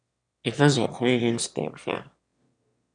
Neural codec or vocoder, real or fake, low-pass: autoencoder, 22.05 kHz, a latent of 192 numbers a frame, VITS, trained on one speaker; fake; 9.9 kHz